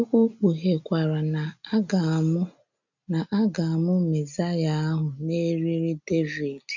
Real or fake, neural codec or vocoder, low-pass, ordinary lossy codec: real; none; 7.2 kHz; none